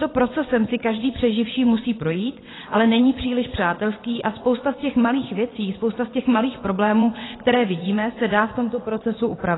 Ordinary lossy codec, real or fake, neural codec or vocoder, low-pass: AAC, 16 kbps; fake; vocoder, 22.05 kHz, 80 mel bands, WaveNeXt; 7.2 kHz